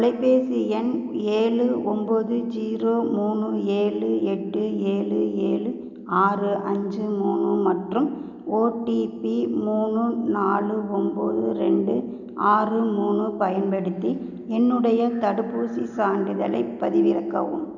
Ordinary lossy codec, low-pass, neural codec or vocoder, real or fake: none; 7.2 kHz; none; real